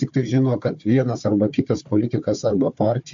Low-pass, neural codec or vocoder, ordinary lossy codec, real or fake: 7.2 kHz; codec, 16 kHz, 4 kbps, FunCodec, trained on Chinese and English, 50 frames a second; MP3, 48 kbps; fake